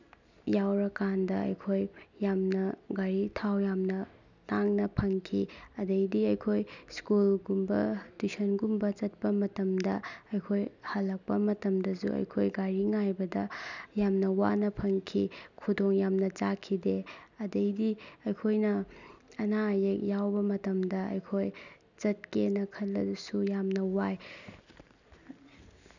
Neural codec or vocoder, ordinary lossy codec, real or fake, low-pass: none; none; real; 7.2 kHz